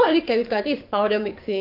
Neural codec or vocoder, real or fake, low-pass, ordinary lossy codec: codec, 44.1 kHz, 7.8 kbps, DAC; fake; 5.4 kHz; none